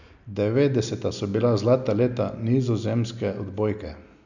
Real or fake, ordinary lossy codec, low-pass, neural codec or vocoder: real; none; 7.2 kHz; none